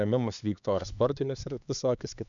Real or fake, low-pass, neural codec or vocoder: fake; 7.2 kHz; codec, 16 kHz, 2 kbps, X-Codec, HuBERT features, trained on LibriSpeech